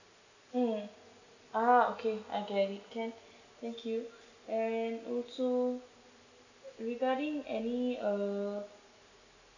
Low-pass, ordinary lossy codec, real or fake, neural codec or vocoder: 7.2 kHz; none; real; none